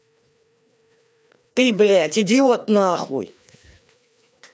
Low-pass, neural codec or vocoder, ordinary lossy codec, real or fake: none; codec, 16 kHz, 2 kbps, FreqCodec, larger model; none; fake